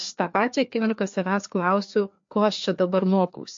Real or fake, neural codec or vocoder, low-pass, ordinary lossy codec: fake; codec, 16 kHz, 2 kbps, FreqCodec, larger model; 7.2 kHz; MP3, 48 kbps